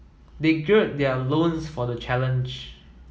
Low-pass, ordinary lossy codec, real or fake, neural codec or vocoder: none; none; real; none